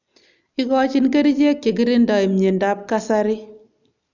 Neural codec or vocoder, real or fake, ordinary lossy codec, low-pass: none; real; none; 7.2 kHz